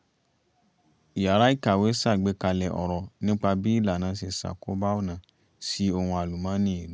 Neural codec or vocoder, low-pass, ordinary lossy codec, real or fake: none; none; none; real